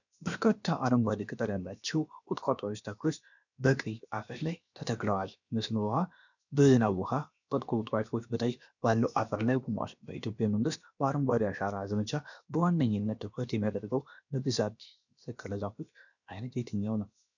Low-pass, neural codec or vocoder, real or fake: 7.2 kHz; codec, 16 kHz, about 1 kbps, DyCAST, with the encoder's durations; fake